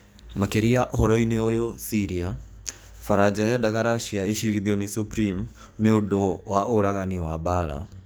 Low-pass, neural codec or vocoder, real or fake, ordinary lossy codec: none; codec, 44.1 kHz, 2.6 kbps, SNAC; fake; none